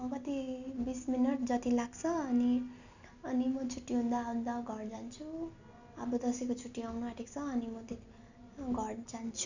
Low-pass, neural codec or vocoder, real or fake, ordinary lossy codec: 7.2 kHz; none; real; none